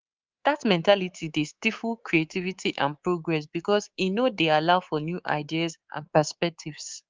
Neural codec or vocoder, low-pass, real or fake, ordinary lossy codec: codec, 24 kHz, 3.1 kbps, DualCodec; 7.2 kHz; fake; Opus, 32 kbps